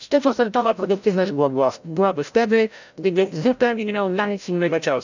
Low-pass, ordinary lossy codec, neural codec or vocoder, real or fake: 7.2 kHz; none; codec, 16 kHz, 0.5 kbps, FreqCodec, larger model; fake